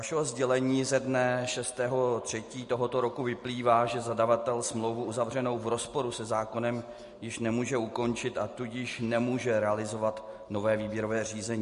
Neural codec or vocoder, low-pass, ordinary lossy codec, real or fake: none; 10.8 kHz; MP3, 48 kbps; real